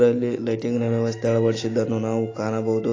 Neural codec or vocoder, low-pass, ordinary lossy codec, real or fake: none; 7.2 kHz; AAC, 32 kbps; real